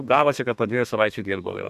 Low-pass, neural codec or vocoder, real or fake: 14.4 kHz; codec, 32 kHz, 1.9 kbps, SNAC; fake